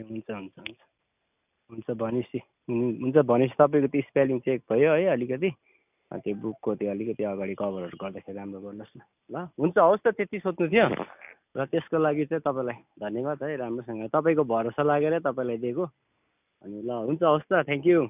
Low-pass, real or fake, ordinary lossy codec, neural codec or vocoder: 3.6 kHz; real; none; none